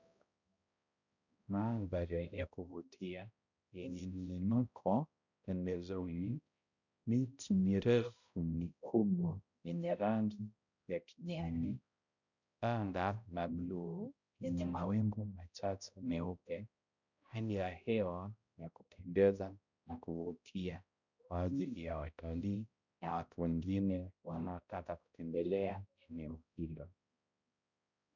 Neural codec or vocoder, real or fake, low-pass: codec, 16 kHz, 0.5 kbps, X-Codec, HuBERT features, trained on balanced general audio; fake; 7.2 kHz